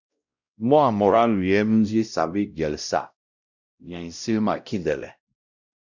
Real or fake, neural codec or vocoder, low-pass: fake; codec, 16 kHz, 0.5 kbps, X-Codec, WavLM features, trained on Multilingual LibriSpeech; 7.2 kHz